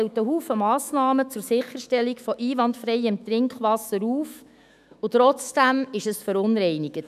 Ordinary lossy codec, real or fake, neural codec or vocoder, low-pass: none; fake; autoencoder, 48 kHz, 128 numbers a frame, DAC-VAE, trained on Japanese speech; 14.4 kHz